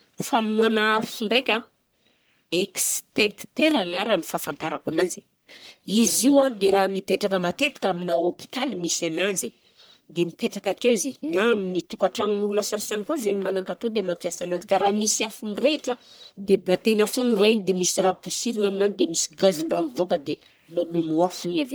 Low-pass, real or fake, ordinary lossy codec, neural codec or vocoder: none; fake; none; codec, 44.1 kHz, 1.7 kbps, Pupu-Codec